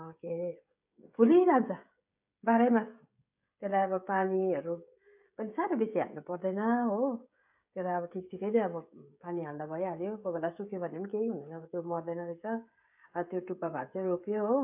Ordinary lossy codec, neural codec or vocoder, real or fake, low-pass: AAC, 32 kbps; codec, 16 kHz, 16 kbps, FreqCodec, smaller model; fake; 3.6 kHz